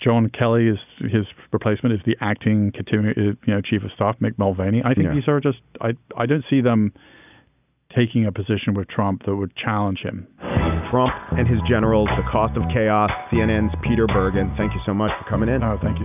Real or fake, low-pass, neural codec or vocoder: real; 3.6 kHz; none